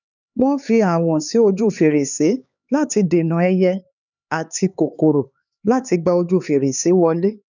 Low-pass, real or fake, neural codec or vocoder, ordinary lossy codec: 7.2 kHz; fake; codec, 16 kHz, 4 kbps, X-Codec, HuBERT features, trained on LibriSpeech; none